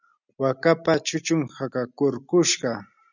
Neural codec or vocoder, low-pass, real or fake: none; 7.2 kHz; real